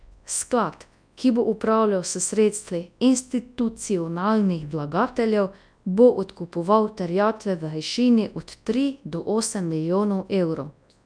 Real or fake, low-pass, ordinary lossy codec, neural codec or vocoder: fake; 9.9 kHz; none; codec, 24 kHz, 0.9 kbps, WavTokenizer, large speech release